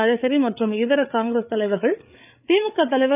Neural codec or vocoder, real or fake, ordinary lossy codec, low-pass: codec, 16 kHz, 8 kbps, FreqCodec, larger model; fake; none; 3.6 kHz